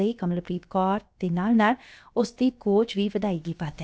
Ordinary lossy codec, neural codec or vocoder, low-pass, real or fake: none; codec, 16 kHz, about 1 kbps, DyCAST, with the encoder's durations; none; fake